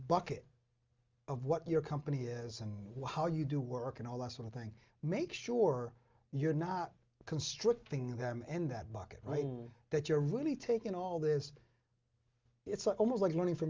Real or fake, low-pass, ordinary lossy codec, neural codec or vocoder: real; 7.2 kHz; Opus, 32 kbps; none